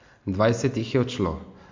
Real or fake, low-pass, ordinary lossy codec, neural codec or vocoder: real; 7.2 kHz; MP3, 48 kbps; none